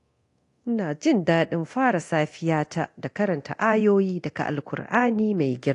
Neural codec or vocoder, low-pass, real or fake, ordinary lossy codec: codec, 24 kHz, 0.9 kbps, DualCodec; 10.8 kHz; fake; AAC, 48 kbps